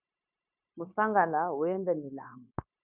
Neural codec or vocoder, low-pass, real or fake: codec, 16 kHz, 0.9 kbps, LongCat-Audio-Codec; 3.6 kHz; fake